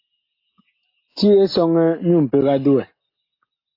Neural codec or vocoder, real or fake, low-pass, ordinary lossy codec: none; real; 5.4 kHz; AAC, 32 kbps